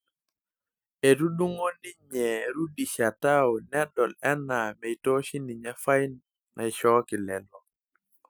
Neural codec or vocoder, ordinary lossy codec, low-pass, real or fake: none; none; none; real